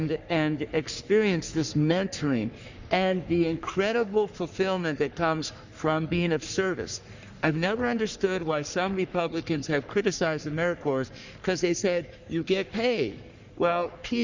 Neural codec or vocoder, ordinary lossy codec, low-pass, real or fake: codec, 44.1 kHz, 3.4 kbps, Pupu-Codec; Opus, 64 kbps; 7.2 kHz; fake